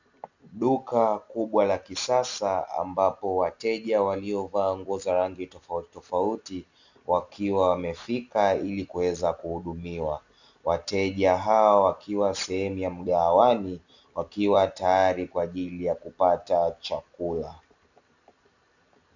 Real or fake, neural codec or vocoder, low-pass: real; none; 7.2 kHz